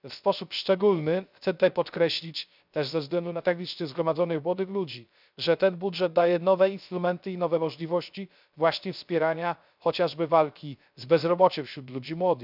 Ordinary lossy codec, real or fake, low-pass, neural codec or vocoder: none; fake; 5.4 kHz; codec, 16 kHz, 0.3 kbps, FocalCodec